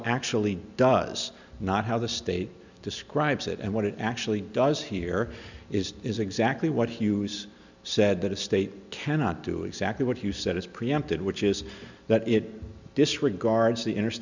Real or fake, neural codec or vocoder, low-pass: real; none; 7.2 kHz